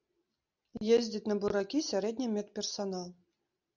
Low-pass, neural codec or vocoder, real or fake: 7.2 kHz; none; real